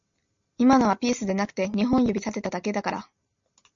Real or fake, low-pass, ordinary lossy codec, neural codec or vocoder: real; 7.2 kHz; MP3, 64 kbps; none